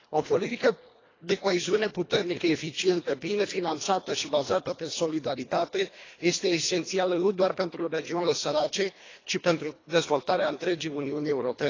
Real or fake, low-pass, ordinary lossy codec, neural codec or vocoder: fake; 7.2 kHz; AAC, 32 kbps; codec, 24 kHz, 1.5 kbps, HILCodec